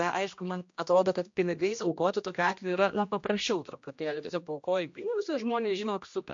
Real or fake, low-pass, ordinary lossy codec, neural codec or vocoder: fake; 7.2 kHz; MP3, 48 kbps; codec, 16 kHz, 1 kbps, X-Codec, HuBERT features, trained on general audio